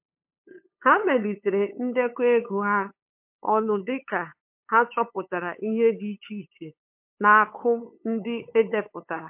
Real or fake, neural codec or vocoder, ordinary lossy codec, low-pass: fake; codec, 16 kHz, 8 kbps, FunCodec, trained on LibriTTS, 25 frames a second; MP3, 32 kbps; 3.6 kHz